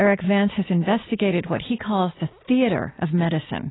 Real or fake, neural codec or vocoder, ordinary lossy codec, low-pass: real; none; AAC, 16 kbps; 7.2 kHz